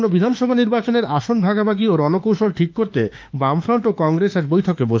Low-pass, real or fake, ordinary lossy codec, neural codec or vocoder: 7.2 kHz; fake; Opus, 24 kbps; autoencoder, 48 kHz, 32 numbers a frame, DAC-VAE, trained on Japanese speech